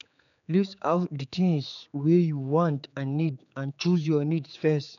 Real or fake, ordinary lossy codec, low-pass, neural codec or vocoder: fake; none; 7.2 kHz; codec, 16 kHz, 4 kbps, X-Codec, HuBERT features, trained on general audio